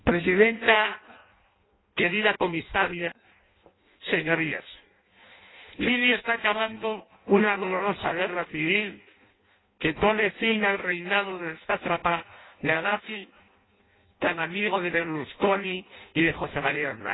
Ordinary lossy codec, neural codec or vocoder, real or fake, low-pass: AAC, 16 kbps; codec, 16 kHz in and 24 kHz out, 0.6 kbps, FireRedTTS-2 codec; fake; 7.2 kHz